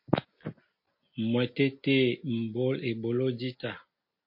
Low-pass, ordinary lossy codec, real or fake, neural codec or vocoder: 5.4 kHz; MP3, 32 kbps; real; none